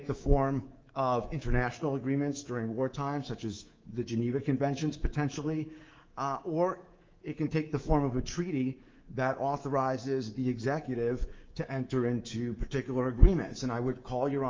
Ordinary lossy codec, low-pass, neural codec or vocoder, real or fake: Opus, 32 kbps; 7.2 kHz; codec, 24 kHz, 3.1 kbps, DualCodec; fake